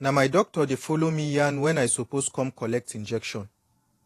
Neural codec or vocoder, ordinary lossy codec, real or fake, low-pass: vocoder, 48 kHz, 128 mel bands, Vocos; AAC, 48 kbps; fake; 14.4 kHz